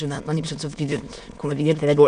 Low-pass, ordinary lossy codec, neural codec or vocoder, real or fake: 9.9 kHz; MP3, 96 kbps; autoencoder, 22.05 kHz, a latent of 192 numbers a frame, VITS, trained on many speakers; fake